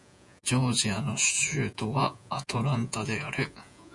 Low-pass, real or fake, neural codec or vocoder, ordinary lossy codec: 10.8 kHz; fake; vocoder, 48 kHz, 128 mel bands, Vocos; MP3, 96 kbps